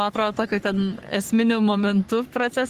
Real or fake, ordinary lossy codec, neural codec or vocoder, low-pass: fake; Opus, 24 kbps; codec, 44.1 kHz, 3.4 kbps, Pupu-Codec; 14.4 kHz